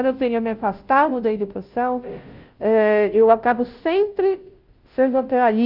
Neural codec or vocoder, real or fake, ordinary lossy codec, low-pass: codec, 16 kHz, 0.5 kbps, FunCodec, trained on Chinese and English, 25 frames a second; fake; Opus, 32 kbps; 5.4 kHz